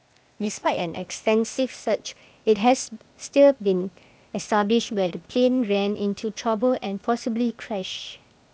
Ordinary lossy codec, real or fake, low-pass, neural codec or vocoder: none; fake; none; codec, 16 kHz, 0.8 kbps, ZipCodec